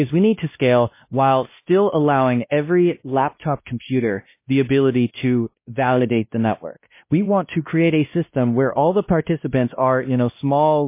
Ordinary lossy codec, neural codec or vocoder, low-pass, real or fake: MP3, 24 kbps; codec, 16 kHz, 1 kbps, X-Codec, WavLM features, trained on Multilingual LibriSpeech; 3.6 kHz; fake